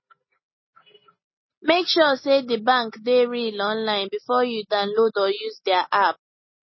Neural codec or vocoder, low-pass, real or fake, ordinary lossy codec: none; 7.2 kHz; real; MP3, 24 kbps